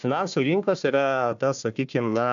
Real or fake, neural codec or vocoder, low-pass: fake; codec, 16 kHz, 1 kbps, FunCodec, trained on Chinese and English, 50 frames a second; 7.2 kHz